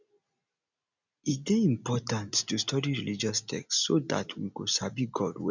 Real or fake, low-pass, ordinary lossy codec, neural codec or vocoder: real; 7.2 kHz; none; none